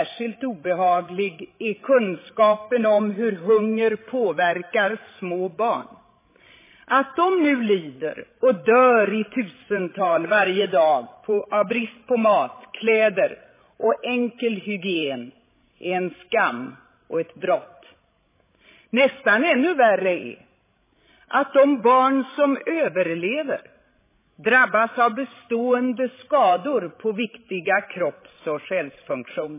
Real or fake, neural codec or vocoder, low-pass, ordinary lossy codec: fake; codec, 16 kHz, 16 kbps, FreqCodec, larger model; 3.6 kHz; MP3, 16 kbps